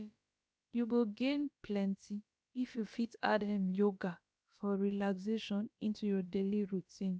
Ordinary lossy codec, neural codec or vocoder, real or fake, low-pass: none; codec, 16 kHz, about 1 kbps, DyCAST, with the encoder's durations; fake; none